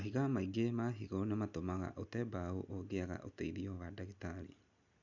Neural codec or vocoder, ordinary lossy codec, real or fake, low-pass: none; none; real; 7.2 kHz